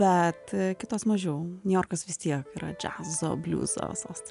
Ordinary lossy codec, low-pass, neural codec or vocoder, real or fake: MP3, 96 kbps; 10.8 kHz; none; real